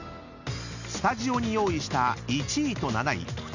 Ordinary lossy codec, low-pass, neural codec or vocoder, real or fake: none; 7.2 kHz; none; real